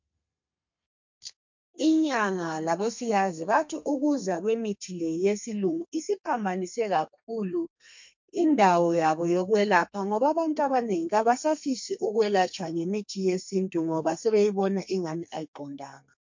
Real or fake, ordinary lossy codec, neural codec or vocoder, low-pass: fake; MP3, 48 kbps; codec, 32 kHz, 1.9 kbps, SNAC; 7.2 kHz